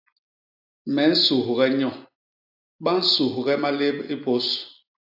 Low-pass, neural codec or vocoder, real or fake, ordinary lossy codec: 5.4 kHz; none; real; AAC, 48 kbps